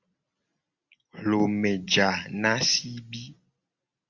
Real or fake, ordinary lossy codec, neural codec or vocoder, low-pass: real; Opus, 64 kbps; none; 7.2 kHz